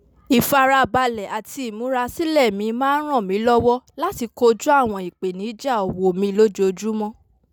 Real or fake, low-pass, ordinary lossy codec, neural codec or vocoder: real; none; none; none